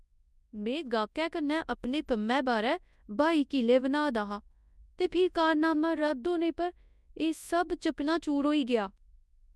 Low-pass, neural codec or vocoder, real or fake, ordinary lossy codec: none; codec, 24 kHz, 0.9 kbps, WavTokenizer, large speech release; fake; none